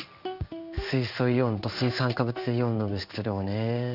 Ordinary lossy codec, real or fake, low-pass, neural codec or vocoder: none; fake; 5.4 kHz; codec, 16 kHz in and 24 kHz out, 1 kbps, XY-Tokenizer